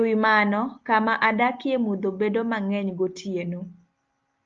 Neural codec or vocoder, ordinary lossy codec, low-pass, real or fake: none; Opus, 24 kbps; 7.2 kHz; real